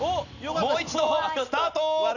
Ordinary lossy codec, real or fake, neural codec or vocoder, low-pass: none; real; none; 7.2 kHz